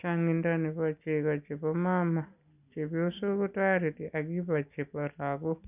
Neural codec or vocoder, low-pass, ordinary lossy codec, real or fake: none; 3.6 kHz; none; real